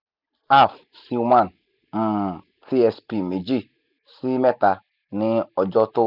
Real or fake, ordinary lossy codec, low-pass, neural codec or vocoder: real; none; 5.4 kHz; none